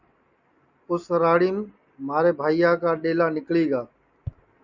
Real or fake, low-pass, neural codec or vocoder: real; 7.2 kHz; none